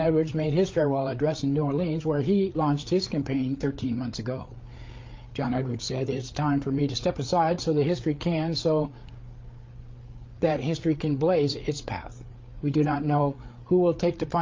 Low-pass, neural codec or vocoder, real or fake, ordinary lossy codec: 7.2 kHz; codec, 16 kHz, 8 kbps, FreqCodec, larger model; fake; Opus, 32 kbps